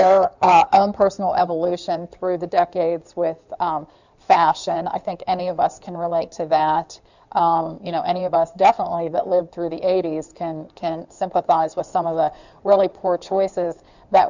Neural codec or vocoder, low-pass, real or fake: codec, 16 kHz in and 24 kHz out, 2.2 kbps, FireRedTTS-2 codec; 7.2 kHz; fake